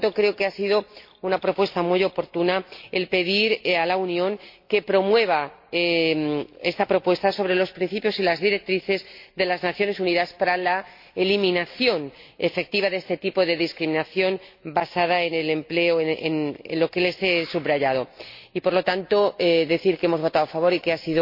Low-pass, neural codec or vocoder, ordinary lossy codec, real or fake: 5.4 kHz; none; MP3, 32 kbps; real